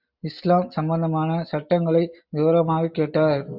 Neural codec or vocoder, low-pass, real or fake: none; 5.4 kHz; real